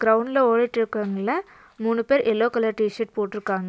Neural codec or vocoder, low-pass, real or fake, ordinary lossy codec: none; none; real; none